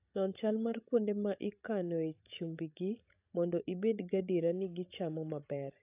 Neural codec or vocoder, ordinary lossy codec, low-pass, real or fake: codec, 16 kHz, 16 kbps, FreqCodec, larger model; AAC, 32 kbps; 3.6 kHz; fake